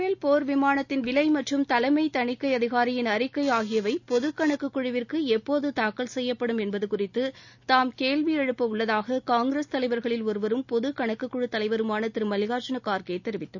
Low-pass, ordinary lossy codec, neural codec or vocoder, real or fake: 7.2 kHz; none; none; real